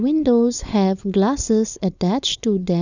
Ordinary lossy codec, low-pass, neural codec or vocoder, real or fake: none; 7.2 kHz; codec, 16 kHz, 4.8 kbps, FACodec; fake